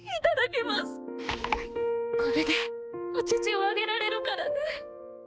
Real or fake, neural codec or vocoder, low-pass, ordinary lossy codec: fake; codec, 16 kHz, 2 kbps, X-Codec, HuBERT features, trained on balanced general audio; none; none